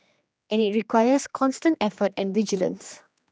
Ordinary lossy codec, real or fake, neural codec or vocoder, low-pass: none; fake; codec, 16 kHz, 2 kbps, X-Codec, HuBERT features, trained on general audio; none